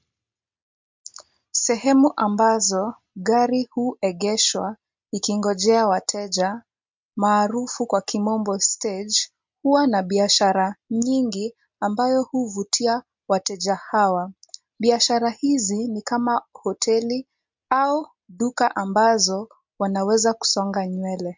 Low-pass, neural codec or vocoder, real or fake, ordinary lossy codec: 7.2 kHz; none; real; MP3, 64 kbps